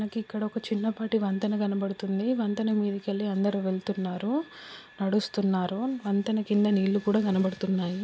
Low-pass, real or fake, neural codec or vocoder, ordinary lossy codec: none; real; none; none